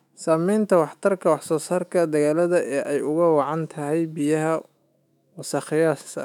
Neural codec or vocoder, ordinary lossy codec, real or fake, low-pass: autoencoder, 48 kHz, 128 numbers a frame, DAC-VAE, trained on Japanese speech; none; fake; 19.8 kHz